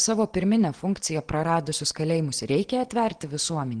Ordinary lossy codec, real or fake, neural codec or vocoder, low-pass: Opus, 16 kbps; real; none; 9.9 kHz